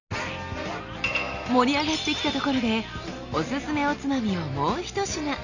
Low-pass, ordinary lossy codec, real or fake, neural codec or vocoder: 7.2 kHz; none; real; none